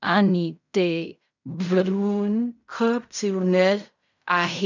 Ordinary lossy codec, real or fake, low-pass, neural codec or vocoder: none; fake; 7.2 kHz; codec, 16 kHz in and 24 kHz out, 0.4 kbps, LongCat-Audio-Codec, fine tuned four codebook decoder